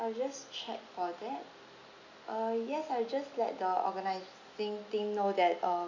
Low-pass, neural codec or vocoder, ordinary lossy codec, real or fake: 7.2 kHz; none; none; real